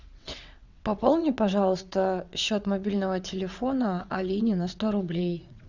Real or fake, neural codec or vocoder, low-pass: fake; vocoder, 22.05 kHz, 80 mel bands, WaveNeXt; 7.2 kHz